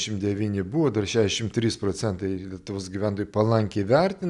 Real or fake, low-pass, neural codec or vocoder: real; 10.8 kHz; none